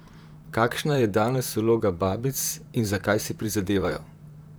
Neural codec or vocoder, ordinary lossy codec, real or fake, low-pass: vocoder, 44.1 kHz, 128 mel bands, Pupu-Vocoder; none; fake; none